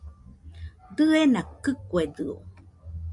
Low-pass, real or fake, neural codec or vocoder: 10.8 kHz; real; none